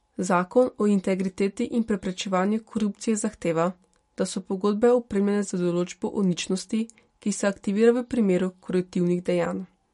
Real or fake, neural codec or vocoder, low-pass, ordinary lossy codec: real; none; 19.8 kHz; MP3, 48 kbps